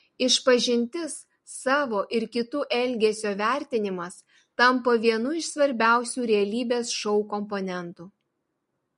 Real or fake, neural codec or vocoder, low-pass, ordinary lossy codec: real; none; 14.4 kHz; MP3, 48 kbps